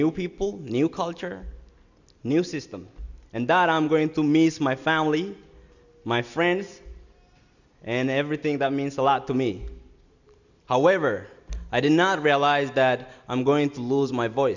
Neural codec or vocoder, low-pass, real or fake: none; 7.2 kHz; real